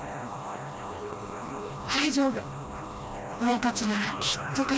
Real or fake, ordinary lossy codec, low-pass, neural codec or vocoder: fake; none; none; codec, 16 kHz, 1 kbps, FreqCodec, smaller model